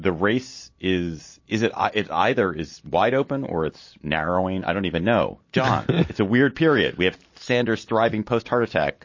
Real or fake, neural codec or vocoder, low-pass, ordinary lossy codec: real; none; 7.2 kHz; MP3, 32 kbps